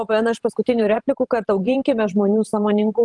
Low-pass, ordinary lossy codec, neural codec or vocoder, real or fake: 10.8 kHz; Opus, 32 kbps; vocoder, 44.1 kHz, 128 mel bands every 512 samples, BigVGAN v2; fake